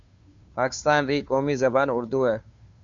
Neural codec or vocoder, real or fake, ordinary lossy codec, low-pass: codec, 16 kHz, 2 kbps, FunCodec, trained on Chinese and English, 25 frames a second; fake; Opus, 64 kbps; 7.2 kHz